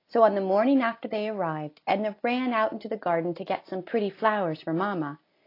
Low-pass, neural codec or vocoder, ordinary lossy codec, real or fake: 5.4 kHz; none; AAC, 32 kbps; real